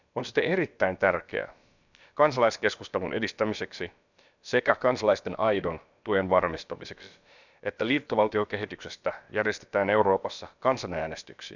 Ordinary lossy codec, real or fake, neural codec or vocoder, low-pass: none; fake; codec, 16 kHz, about 1 kbps, DyCAST, with the encoder's durations; 7.2 kHz